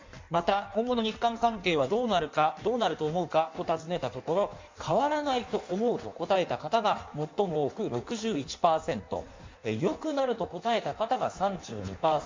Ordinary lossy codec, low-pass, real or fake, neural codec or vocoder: MP3, 64 kbps; 7.2 kHz; fake; codec, 16 kHz in and 24 kHz out, 1.1 kbps, FireRedTTS-2 codec